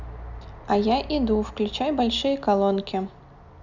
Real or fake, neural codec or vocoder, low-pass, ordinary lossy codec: real; none; 7.2 kHz; none